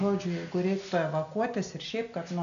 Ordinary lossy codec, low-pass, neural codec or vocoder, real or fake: MP3, 96 kbps; 7.2 kHz; none; real